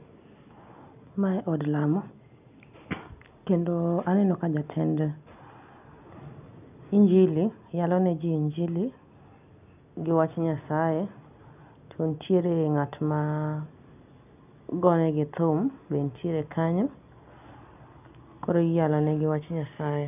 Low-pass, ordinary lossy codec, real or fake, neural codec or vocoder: 3.6 kHz; none; real; none